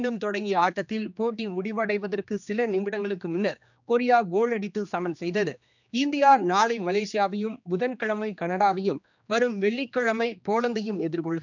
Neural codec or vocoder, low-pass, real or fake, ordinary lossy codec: codec, 16 kHz, 2 kbps, X-Codec, HuBERT features, trained on general audio; 7.2 kHz; fake; none